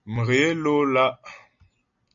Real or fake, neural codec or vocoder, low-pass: real; none; 7.2 kHz